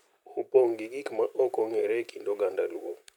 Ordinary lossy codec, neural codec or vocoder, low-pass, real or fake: none; none; 19.8 kHz; real